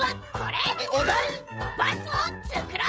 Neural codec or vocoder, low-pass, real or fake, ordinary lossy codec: codec, 16 kHz, 16 kbps, FreqCodec, smaller model; none; fake; none